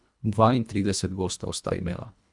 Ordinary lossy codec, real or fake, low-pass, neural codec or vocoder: none; fake; 10.8 kHz; codec, 24 kHz, 1.5 kbps, HILCodec